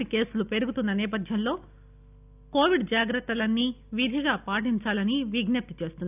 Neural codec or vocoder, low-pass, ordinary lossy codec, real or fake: none; 3.6 kHz; none; real